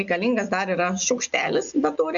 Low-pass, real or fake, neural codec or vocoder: 7.2 kHz; real; none